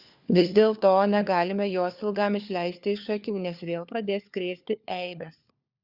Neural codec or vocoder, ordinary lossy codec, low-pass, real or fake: codec, 16 kHz, 4 kbps, FunCodec, trained on LibriTTS, 50 frames a second; Opus, 64 kbps; 5.4 kHz; fake